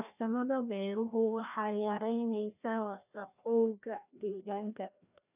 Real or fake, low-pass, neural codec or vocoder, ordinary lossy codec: fake; 3.6 kHz; codec, 16 kHz, 1 kbps, FreqCodec, larger model; none